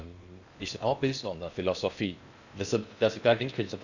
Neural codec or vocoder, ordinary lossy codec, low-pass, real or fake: codec, 16 kHz in and 24 kHz out, 0.8 kbps, FocalCodec, streaming, 65536 codes; none; 7.2 kHz; fake